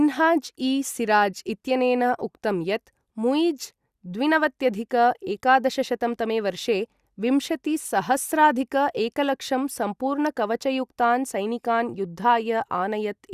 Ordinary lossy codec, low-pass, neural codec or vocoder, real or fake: Opus, 64 kbps; 14.4 kHz; none; real